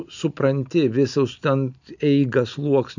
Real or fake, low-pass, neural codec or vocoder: real; 7.2 kHz; none